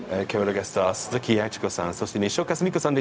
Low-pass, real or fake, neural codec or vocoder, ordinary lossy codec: none; fake; codec, 16 kHz, 0.4 kbps, LongCat-Audio-Codec; none